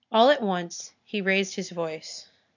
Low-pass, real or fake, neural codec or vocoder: 7.2 kHz; real; none